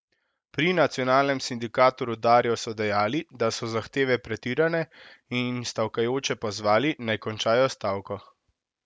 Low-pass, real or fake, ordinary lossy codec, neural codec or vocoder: none; real; none; none